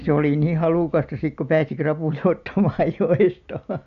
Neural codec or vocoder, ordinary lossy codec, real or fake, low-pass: none; none; real; 7.2 kHz